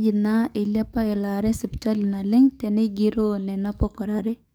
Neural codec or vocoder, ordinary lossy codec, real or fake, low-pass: codec, 44.1 kHz, 7.8 kbps, DAC; none; fake; none